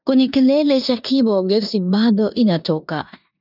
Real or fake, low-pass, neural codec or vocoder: fake; 5.4 kHz; codec, 16 kHz in and 24 kHz out, 0.9 kbps, LongCat-Audio-Codec, four codebook decoder